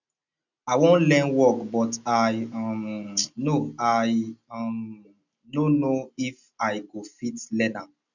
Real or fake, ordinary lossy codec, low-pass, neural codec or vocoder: real; none; 7.2 kHz; none